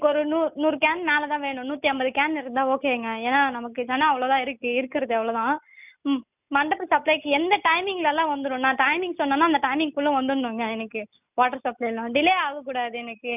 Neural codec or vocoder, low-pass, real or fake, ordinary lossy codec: none; 3.6 kHz; real; none